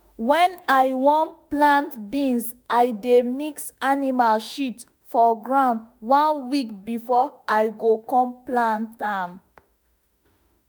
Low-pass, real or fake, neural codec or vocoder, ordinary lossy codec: none; fake; autoencoder, 48 kHz, 32 numbers a frame, DAC-VAE, trained on Japanese speech; none